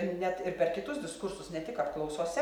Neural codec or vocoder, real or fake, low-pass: none; real; 19.8 kHz